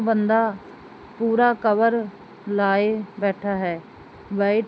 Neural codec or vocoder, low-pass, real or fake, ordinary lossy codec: none; none; real; none